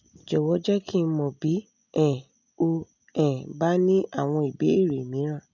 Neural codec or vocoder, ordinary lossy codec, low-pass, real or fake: none; none; 7.2 kHz; real